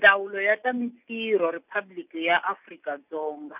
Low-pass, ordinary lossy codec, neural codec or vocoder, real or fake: 3.6 kHz; none; none; real